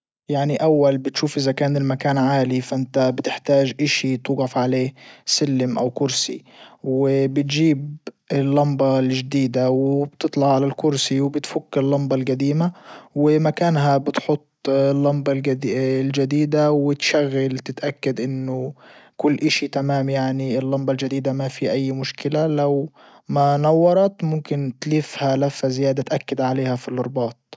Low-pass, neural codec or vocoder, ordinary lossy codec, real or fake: none; none; none; real